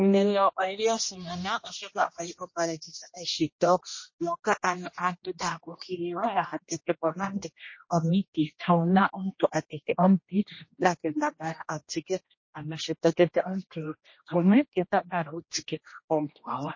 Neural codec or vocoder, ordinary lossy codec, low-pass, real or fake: codec, 16 kHz, 1 kbps, X-Codec, HuBERT features, trained on general audio; MP3, 32 kbps; 7.2 kHz; fake